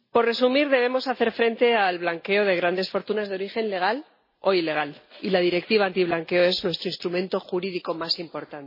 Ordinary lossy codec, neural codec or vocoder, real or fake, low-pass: MP3, 24 kbps; none; real; 5.4 kHz